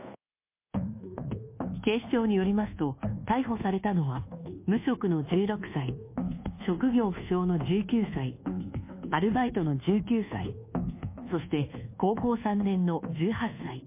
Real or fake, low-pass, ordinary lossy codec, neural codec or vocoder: fake; 3.6 kHz; MP3, 24 kbps; codec, 24 kHz, 1.2 kbps, DualCodec